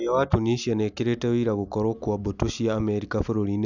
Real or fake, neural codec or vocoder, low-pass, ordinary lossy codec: real; none; 7.2 kHz; none